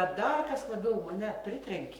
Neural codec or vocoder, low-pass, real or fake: codec, 44.1 kHz, 7.8 kbps, Pupu-Codec; 19.8 kHz; fake